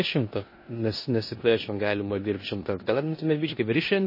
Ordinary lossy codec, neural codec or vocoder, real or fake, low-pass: MP3, 24 kbps; codec, 16 kHz in and 24 kHz out, 0.9 kbps, LongCat-Audio-Codec, four codebook decoder; fake; 5.4 kHz